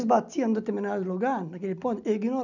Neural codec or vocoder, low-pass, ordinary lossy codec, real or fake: none; 7.2 kHz; none; real